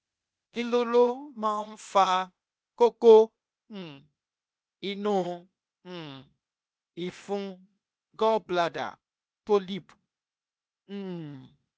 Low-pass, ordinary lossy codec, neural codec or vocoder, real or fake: none; none; codec, 16 kHz, 0.8 kbps, ZipCodec; fake